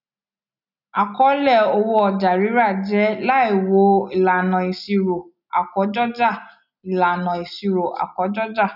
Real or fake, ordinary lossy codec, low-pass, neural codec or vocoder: real; none; 5.4 kHz; none